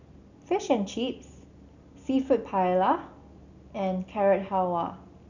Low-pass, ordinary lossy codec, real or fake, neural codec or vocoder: 7.2 kHz; none; real; none